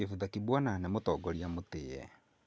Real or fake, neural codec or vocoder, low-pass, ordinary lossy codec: real; none; none; none